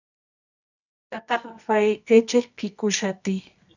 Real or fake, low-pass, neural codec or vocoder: fake; 7.2 kHz; codec, 24 kHz, 0.9 kbps, WavTokenizer, medium music audio release